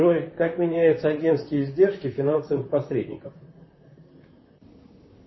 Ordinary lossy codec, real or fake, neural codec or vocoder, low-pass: MP3, 24 kbps; fake; vocoder, 44.1 kHz, 128 mel bands, Pupu-Vocoder; 7.2 kHz